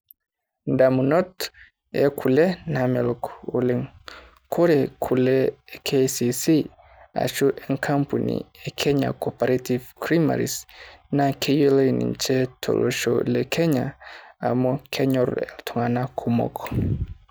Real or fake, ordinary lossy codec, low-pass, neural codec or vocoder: real; none; none; none